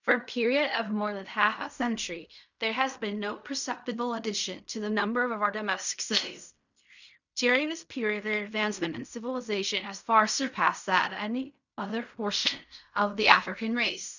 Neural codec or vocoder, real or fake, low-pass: codec, 16 kHz in and 24 kHz out, 0.4 kbps, LongCat-Audio-Codec, fine tuned four codebook decoder; fake; 7.2 kHz